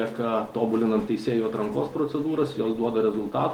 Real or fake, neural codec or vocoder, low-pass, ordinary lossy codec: real; none; 19.8 kHz; Opus, 16 kbps